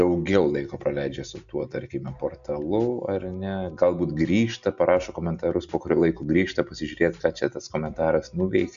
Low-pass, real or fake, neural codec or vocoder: 7.2 kHz; real; none